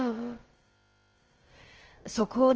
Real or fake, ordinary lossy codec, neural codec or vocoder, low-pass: fake; Opus, 16 kbps; codec, 16 kHz, about 1 kbps, DyCAST, with the encoder's durations; 7.2 kHz